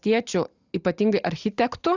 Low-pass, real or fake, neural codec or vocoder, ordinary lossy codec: 7.2 kHz; real; none; Opus, 64 kbps